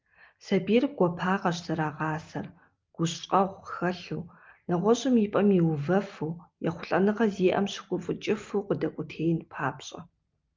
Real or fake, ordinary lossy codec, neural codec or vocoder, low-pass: real; Opus, 24 kbps; none; 7.2 kHz